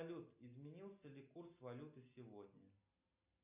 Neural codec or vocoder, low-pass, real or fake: none; 3.6 kHz; real